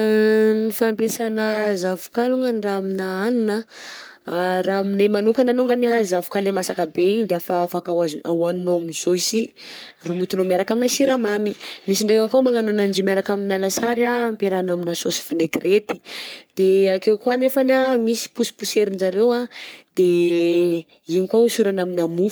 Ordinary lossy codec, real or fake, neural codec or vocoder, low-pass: none; fake; codec, 44.1 kHz, 3.4 kbps, Pupu-Codec; none